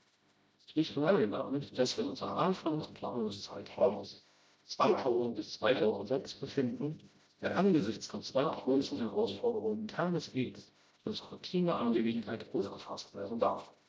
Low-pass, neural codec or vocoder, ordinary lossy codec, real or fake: none; codec, 16 kHz, 0.5 kbps, FreqCodec, smaller model; none; fake